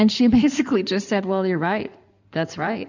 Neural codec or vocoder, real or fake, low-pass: codec, 16 kHz in and 24 kHz out, 2.2 kbps, FireRedTTS-2 codec; fake; 7.2 kHz